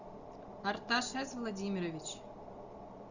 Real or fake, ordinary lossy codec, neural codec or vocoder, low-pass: real; Opus, 64 kbps; none; 7.2 kHz